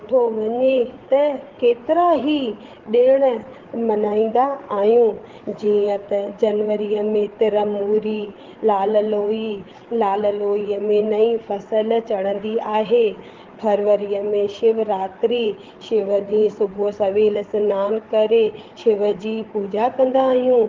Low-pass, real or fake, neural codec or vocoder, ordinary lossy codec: 7.2 kHz; fake; codec, 16 kHz, 8 kbps, FreqCodec, larger model; Opus, 16 kbps